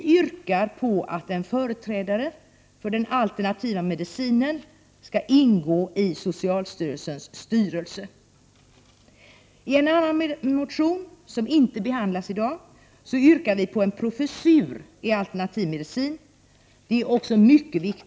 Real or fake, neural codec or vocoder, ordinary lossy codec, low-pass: real; none; none; none